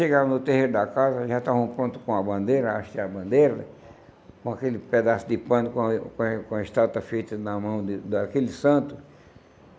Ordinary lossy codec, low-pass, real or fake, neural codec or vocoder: none; none; real; none